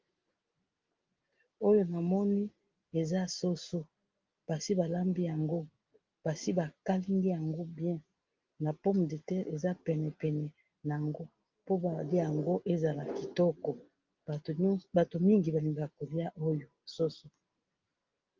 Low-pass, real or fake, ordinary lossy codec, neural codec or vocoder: 7.2 kHz; real; Opus, 24 kbps; none